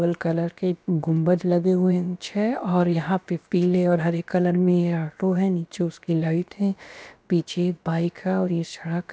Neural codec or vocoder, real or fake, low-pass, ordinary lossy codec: codec, 16 kHz, about 1 kbps, DyCAST, with the encoder's durations; fake; none; none